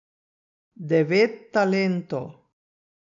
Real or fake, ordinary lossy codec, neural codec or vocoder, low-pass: real; none; none; 7.2 kHz